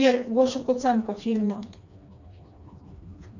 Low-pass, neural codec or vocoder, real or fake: 7.2 kHz; codec, 16 kHz, 2 kbps, FreqCodec, smaller model; fake